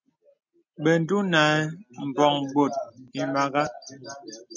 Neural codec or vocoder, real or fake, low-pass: none; real; 7.2 kHz